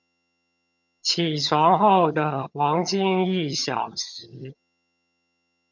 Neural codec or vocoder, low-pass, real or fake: vocoder, 22.05 kHz, 80 mel bands, HiFi-GAN; 7.2 kHz; fake